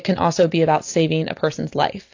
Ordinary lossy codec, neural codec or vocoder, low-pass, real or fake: AAC, 48 kbps; none; 7.2 kHz; real